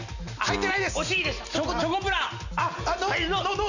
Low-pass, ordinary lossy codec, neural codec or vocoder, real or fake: 7.2 kHz; none; none; real